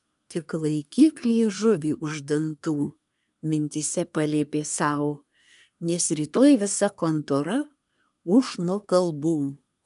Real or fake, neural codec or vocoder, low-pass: fake; codec, 24 kHz, 1 kbps, SNAC; 10.8 kHz